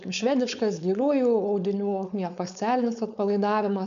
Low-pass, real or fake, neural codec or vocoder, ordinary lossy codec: 7.2 kHz; fake; codec, 16 kHz, 4.8 kbps, FACodec; AAC, 96 kbps